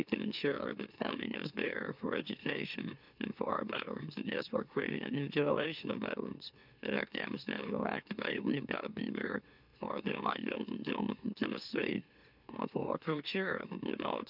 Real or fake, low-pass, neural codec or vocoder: fake; 5.4 kHz; autoencoder, 44.1 kHz, a latent of 192 numbers a frame, MeloTTS